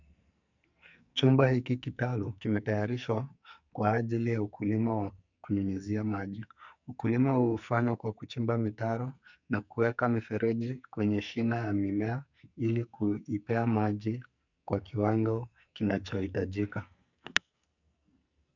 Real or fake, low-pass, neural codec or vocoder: fake; 7.2 kHz; codec, 44.1 kHz, 2.6 kbps, SNAC